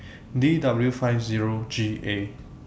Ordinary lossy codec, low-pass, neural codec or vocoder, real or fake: none; none; none; real